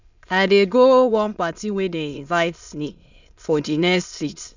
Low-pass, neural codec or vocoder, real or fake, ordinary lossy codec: 7.2 kHz; autoencoder, 22.05 kHz, a latent of 192 numbers a frame, VITS, trained on many speakers; fake; none